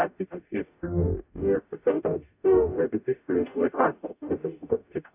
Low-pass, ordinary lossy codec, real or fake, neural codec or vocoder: 3.6 kHz; AAC, 32 kbps; fake; codec, 44.1 kHz, 0.9 kbps, DAC